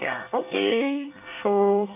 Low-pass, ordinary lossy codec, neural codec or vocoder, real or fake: 3.6 kHz; none; codec, 24 kHz, 1 kbps, SNAC; fake